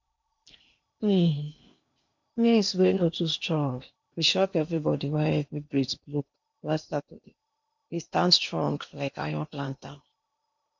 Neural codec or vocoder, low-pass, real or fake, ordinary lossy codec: codec, 16 kHz in and 24 kHz out, 0.8 kbps, FocalCodec, streaming, 65536 codes; 7.2 kHz; fake; MP3, 64 kbps